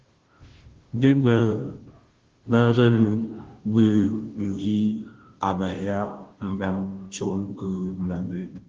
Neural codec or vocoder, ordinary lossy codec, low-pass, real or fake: codec, 16 kHz, 0.5 kbps, FunCodec, trained on Chinese and English, 25 frames a second; Opus, 16 kbps; 7.2 kHz; fake